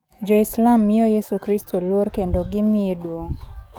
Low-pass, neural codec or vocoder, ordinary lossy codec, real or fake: none; codec, 44.1 kHz, 7.8 kbps, DAC; none; fake